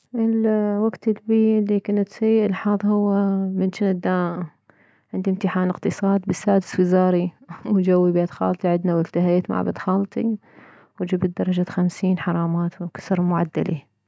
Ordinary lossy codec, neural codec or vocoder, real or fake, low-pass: none; none; real; none